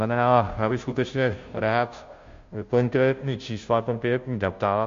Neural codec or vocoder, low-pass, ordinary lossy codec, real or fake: codec, 16 kHz, 0.5 kbps, FunCodec, trained on Chinese and English, 25 frames a second; 7.2 kHz; MP3, 48 kbps; fake